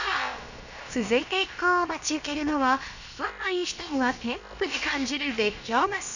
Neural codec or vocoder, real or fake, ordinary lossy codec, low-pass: codec, 16 kHz, about 1 kbps, DyCAST, with the encoder's durations; fake; none; 7.2 kHz